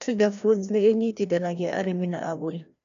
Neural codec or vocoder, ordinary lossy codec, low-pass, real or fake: codec, 16 kHz, 1 kbps, FreqCodec, larger model; none; 7.2 kHz; fake